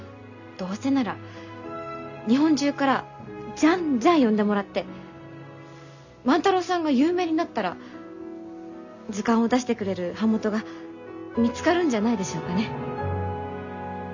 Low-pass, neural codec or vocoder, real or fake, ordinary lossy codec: 7.2 kHz; none; real; none